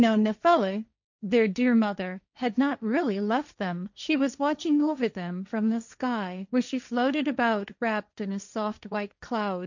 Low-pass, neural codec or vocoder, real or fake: 7.2 kHz; codec, 16 kHz, 1.1 kbps, Voila-Tokenizer; fake